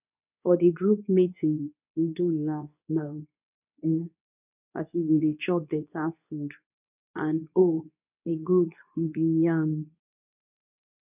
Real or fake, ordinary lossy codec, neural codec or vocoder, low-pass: fake; none; codec, 24 kHz, 0.9 kbps, WavTokenizer, medium speech release version 2; 3.6 kHz